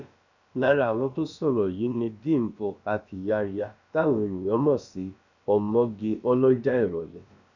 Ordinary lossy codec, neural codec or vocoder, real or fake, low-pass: none; codec, 16 kHz, about 1 kbps, DyCAST, with the encoder's durations; fake; 7.2 kHz